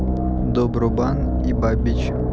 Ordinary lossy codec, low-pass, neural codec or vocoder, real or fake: none; none; none; real